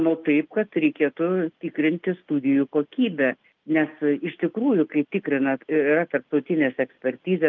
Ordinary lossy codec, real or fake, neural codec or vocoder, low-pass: Opus, 24 kbps; real; none; 7.2 kHz